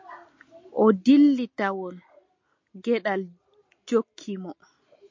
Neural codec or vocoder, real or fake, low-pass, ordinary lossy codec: none; real; 7.2 kHz; MP3, 48 kbps